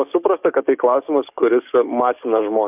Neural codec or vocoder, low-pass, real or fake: codec, 44.1 kHz, 7.8 kbps, DAC; 3.6 kHz; fake